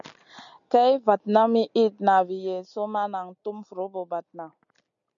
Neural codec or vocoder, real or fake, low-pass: none; real; 7.2 kHz